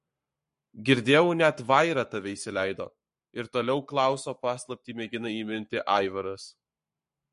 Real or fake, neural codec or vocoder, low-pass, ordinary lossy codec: fake; codec, 44.1 kHz, 7.8 kbps, Pupu-Codec; 14.4 kHz; MP3, 48 kbps